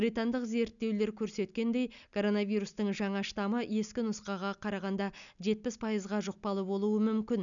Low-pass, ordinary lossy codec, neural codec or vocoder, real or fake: 7.2 kHz; none; none; real